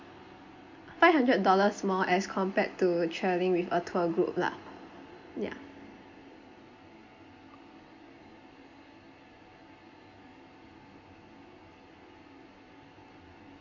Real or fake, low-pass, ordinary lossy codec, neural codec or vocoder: real; 7.2 kHz; MP3, 48 kbps; none